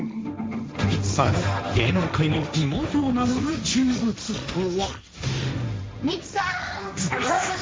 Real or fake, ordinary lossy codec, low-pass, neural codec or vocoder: fake; none; none; codec, 16 kHz, 1.1 kbps, Voila-Tokenizer